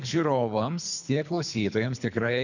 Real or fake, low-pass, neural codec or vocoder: fake; 7.2 kHz; codec, 24 kHz, 3 kbps, HILCodec